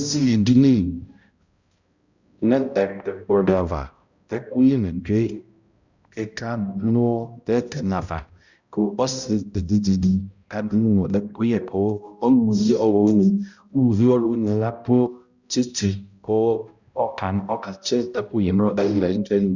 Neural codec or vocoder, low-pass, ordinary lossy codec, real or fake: codec, 16 kHz, 0.5 kbps, X-Codec, HuBERT features, trained on balanced general audio; 7.2 kHz; Opus, 64 kbps; fake